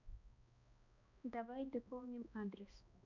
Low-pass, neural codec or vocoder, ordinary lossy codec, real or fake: 7.2 kHz; codec, 16 kHz, 1 kbps, X-Codec, HuBERT features, trained on balanced general audio; none; fake